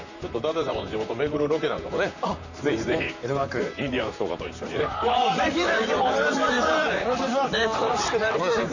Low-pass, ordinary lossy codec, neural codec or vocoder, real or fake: 7.2 kHz; none; vocoder, 44.1 kHz, 128 mel bands, Pupu-Vocoder; fake